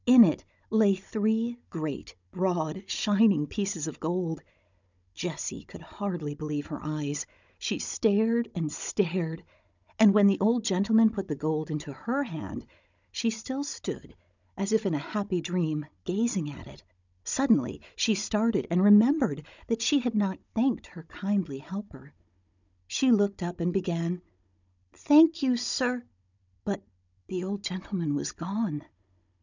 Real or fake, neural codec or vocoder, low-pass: fake; codec, 16 kHz, 16 kbps, FunCodec, trained on Chinese and English, 50 frames a second; 7.2 kHz